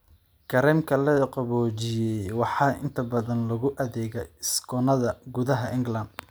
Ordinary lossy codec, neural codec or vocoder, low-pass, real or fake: none; none; none; real